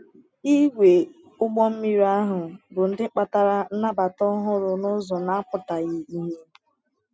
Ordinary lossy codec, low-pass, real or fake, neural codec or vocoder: none; none; real; none